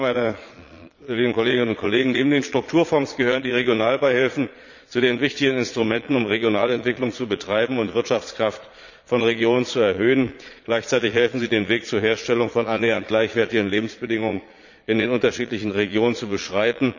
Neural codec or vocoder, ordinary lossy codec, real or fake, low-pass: vocoder, 22.05 kHz, 80 mel bands, Vocos; none; fake; 7.2 kHz